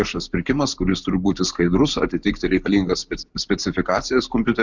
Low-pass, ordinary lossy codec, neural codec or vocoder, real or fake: 7.2 kHz; Opus, 64 kbps; none; real